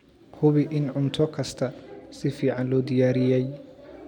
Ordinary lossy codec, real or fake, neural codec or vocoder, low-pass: MP3, 96 kbps; real; none; 19.8 kHz